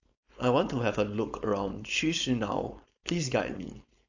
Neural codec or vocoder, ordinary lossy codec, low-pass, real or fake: codec, 16 kHz, 4.8 kbps, FACodec; AAC, 48 kbps; 7.2 kHz; fake